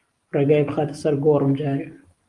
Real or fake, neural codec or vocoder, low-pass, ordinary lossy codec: real; none; 10.8 kHz; Opus, 24 kbps